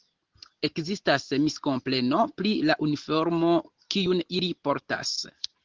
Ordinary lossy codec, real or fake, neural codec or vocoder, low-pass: Opus, 16 kbps; real; none; 7.2 kHz